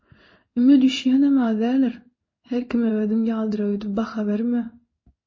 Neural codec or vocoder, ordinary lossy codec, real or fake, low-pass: codec, 16 kHz in and 24 kHz out, 1 kbps, XY-Tokenizer; MP3, 32 kbps; fake; 7.2 kHz